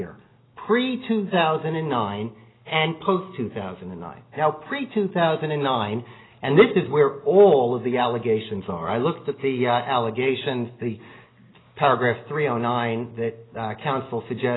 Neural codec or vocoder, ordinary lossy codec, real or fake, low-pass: none; AAC, 16 kbps; real; 7.2 kHz